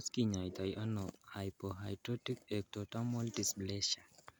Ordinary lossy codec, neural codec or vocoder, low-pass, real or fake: none; none; none; real